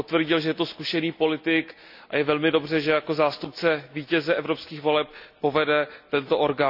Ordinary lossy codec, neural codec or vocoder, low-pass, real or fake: none; none; 5.4 kHz; real